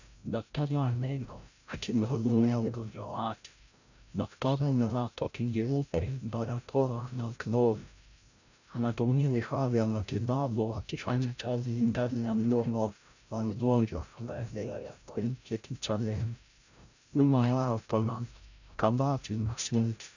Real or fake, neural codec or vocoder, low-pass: fake; codec, 16 kHz, 0.5 kbps, FreqCodec, larger model; 7.2 kHz